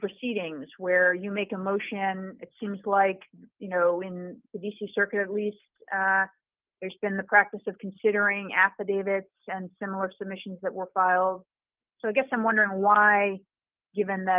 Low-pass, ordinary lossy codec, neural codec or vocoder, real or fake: 3.6 kHz; Opus, 32 kbps; none; real